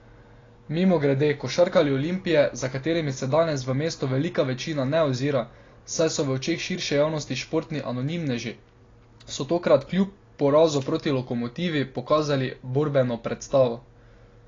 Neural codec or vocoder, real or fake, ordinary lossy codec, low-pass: none; real; AAC, 32 kbps; 7.2 kHz